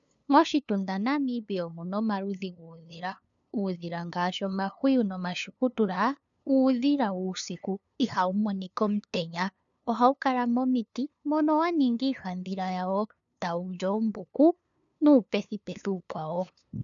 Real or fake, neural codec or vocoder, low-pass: fake; codec, 16 kHz, 2 kbps, FunCodec, trained on LibriTTS, 25 frames a second; 7.2 kHz